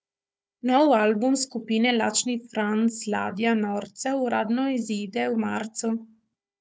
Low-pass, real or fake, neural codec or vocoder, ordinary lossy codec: none; fake; codec, 16 kHz, 4 kbps, FunCodec, trained on Chinese and English, 50 frames a second; none